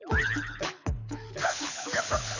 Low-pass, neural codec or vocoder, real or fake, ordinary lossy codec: 7.2 kHz; codec, 24 kHz, 6 kbps, HILCodec; fake; none